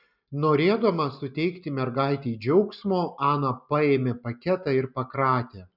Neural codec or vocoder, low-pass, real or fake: none; 5.4 kHz; real